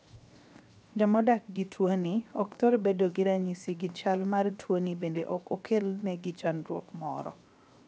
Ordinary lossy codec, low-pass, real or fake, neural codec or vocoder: none; none; fake; codec, 16 kHz, 0.7 kbps, FocalCodec